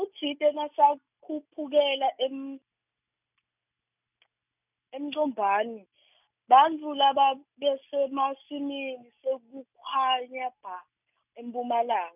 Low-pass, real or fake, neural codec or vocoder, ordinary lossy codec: 3.6 kHz; real; none; none